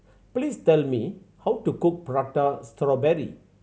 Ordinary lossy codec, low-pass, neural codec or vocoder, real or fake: none; none; none; real